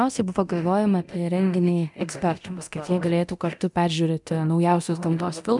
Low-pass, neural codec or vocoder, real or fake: 10.8 kHz; codec, 24 kHz, 0.9 kbps, DualCodec; fake